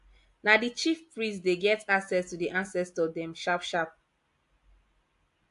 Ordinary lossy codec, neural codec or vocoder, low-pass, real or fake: AAC, 64 kbps; none; 10.8 kHz; real